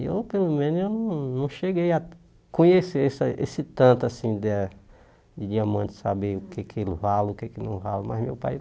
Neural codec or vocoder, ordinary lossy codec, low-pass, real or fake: none; none; none; real